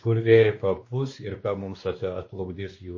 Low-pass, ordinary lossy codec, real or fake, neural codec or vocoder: 7.2 kHz; MP3, 32 kbps; fake; codec, 16 kHz, 2 kbps, X-Codec, WavLM features, trained on Multilingual LibriSpeech